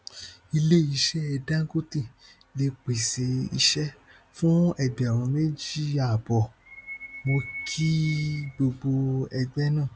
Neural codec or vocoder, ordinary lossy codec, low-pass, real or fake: none; none; none; real